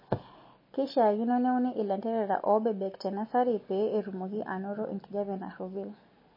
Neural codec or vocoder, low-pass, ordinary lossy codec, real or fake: none; 5.4 kHz; MP3, 24 kbps; real